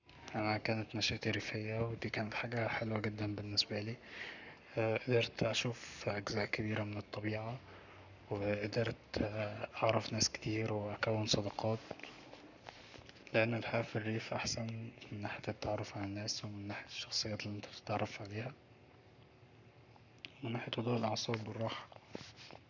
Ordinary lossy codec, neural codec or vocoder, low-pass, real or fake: none; codec, 44.1 kHz, 7.8 kbps, Pupu-Codec; 7.2 kHz; fake